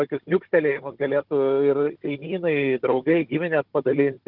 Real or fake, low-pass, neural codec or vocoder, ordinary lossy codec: fake; 5.4 kHz; codec, 16 kHz, 16 kbps, FunCodec, trained on Chinese and English, 50 frames a second; Opus, 16 kbps